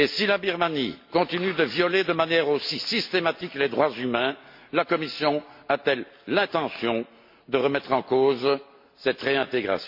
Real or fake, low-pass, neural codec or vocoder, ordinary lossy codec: real; 5.4 kHz; none; MP3, 32 kbps